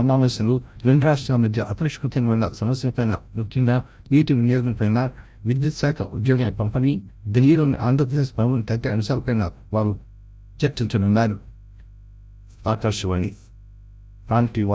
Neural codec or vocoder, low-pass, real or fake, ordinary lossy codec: codec, 16 kHz, 0.5 kbps, FreqCodec, larger model; none; fake; none